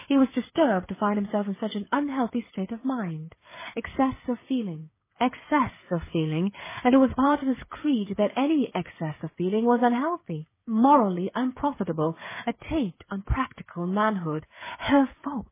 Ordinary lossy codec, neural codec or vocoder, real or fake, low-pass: MP3, 16 kbps; codec, 16 kHz, 8 kbps, FreqCodec, smaller model; fake; 3.6 kHz